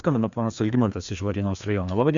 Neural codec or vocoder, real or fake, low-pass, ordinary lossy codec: codec, 16 kHz, 2 kbps, FreqCodec, larger model; fake; 7.2 kHz; Opus, 64 kbps